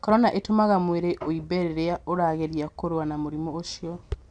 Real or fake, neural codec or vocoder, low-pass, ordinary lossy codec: real; none; 9.9 kHz; none